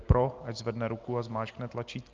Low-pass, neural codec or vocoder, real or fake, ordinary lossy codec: 7.2 kHz; none; real; Opus, 24 kbps